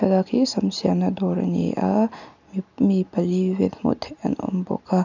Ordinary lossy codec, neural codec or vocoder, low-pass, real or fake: none; none; 7.2 kHz; real